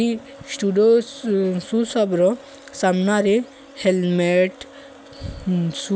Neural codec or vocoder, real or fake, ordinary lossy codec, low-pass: none; real; none; none